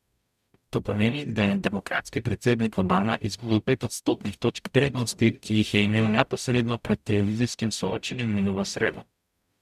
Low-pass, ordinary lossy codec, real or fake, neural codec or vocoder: 14.4 kHz; none; fake; codec, 44.1 kHz, 0.9 kbps, DAC